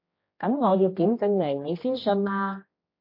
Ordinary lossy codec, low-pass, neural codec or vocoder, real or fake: MP3, 32 kbps; 5.4 kHz; codec, 16 kHz, 1 kbps, X-Codec, HuBERT features, trained on general audio; fake